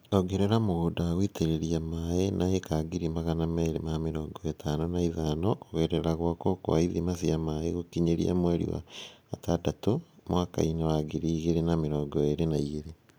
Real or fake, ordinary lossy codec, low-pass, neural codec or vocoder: real; none; none; none